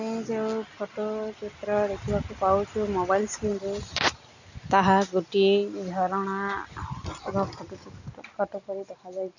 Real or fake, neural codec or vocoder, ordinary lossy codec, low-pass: real; none; none; 7.2 kHz